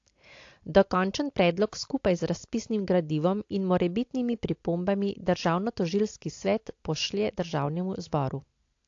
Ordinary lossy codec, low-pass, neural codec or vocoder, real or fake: AAC, 48 kbps; 7.2 kHz; none; real